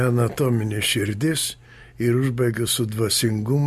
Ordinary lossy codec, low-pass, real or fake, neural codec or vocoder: MP3, 64 kbps; 14.4 kHz; real; none